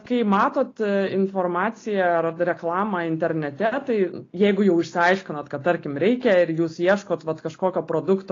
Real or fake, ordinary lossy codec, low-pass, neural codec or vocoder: real; AAC, 32 kbps; 7.2 kHz; none